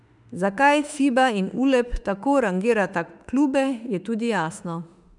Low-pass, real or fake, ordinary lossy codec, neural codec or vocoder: 10.8 kHz; fake; none; autoencoder, 48 kHz, 32 numbers a frame, DAC-VAE, trained on Japanese speech